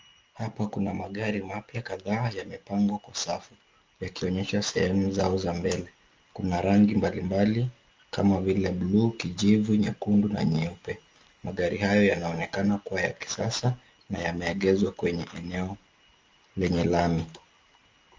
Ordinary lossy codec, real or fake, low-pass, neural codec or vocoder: Opus, 32 kbps; real; 7.2 kHz; none